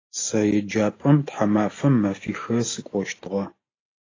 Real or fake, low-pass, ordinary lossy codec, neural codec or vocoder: real; 7.2 kHz; AAC, 32 kbps; none